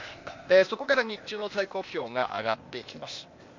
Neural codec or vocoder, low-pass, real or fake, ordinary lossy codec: codec, 16 kHz, 0.8 kbps, ZipCodec; 7.2 kHz; fake; MP3, 48 kbps